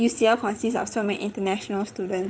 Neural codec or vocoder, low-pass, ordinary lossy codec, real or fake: codec, 16 kHz, 8 kbps, FreqCodec, larger model; none; none; fake